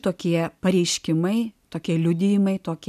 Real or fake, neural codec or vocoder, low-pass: real; none; 14.4 kHz